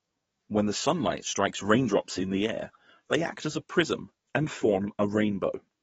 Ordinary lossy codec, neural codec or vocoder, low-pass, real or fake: AAC, 24 kbps; codec, 44.1 kHz, 7.8 kbps, DAC; 19.8 kHz; fake